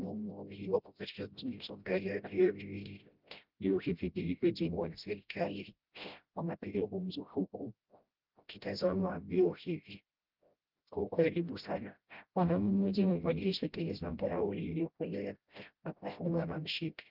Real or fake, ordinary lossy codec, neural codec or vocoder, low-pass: fake; Opus, 32 kbps; codec, 16 kHz, 0.5 kbps, FreqCodec, smaller model; 5.4 kHz